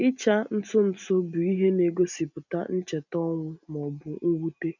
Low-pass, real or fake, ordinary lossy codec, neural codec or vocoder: 7.2 kHz; real; none; none